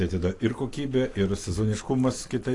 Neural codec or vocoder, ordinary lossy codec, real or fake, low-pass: none; AAC, 48 kbps; real; 10.8 kHz